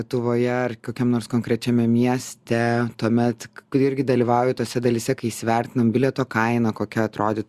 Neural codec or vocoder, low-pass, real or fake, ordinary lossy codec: none; 14.4 kHz; real; Opus, 64 kbps